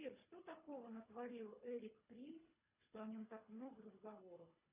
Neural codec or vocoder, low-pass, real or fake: codec, 24 kHz, 3 kbps, HILCodec; 3.6 kHz; fake